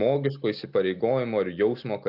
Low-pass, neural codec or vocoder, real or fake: 5.4 kHz; none; real